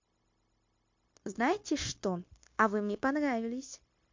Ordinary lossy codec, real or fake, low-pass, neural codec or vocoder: MP3, 48 kbps; fake; 7.2 kHz; codec, 16 kHz, 0.9 kbps, LongCat-Audio-Codec